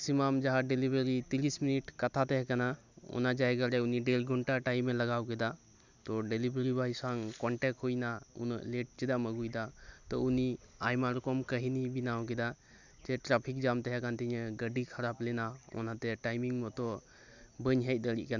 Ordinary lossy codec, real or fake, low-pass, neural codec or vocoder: none; real; 7.2 kHz; none